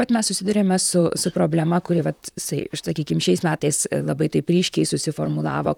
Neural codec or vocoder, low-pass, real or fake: vocoder, 44.1 kHz, 128 mel bands, Pupu-Vocoder; 19.8 kHz; fake